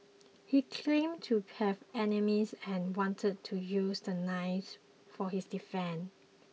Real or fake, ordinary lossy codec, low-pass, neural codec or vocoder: real; none; none; none